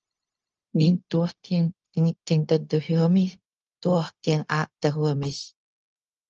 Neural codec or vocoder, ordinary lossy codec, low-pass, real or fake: codec, 16 kHz, 0.4 kbps, LongCat-Audio-Codec; Opus, 24 kbps; 7.2 kHz; fake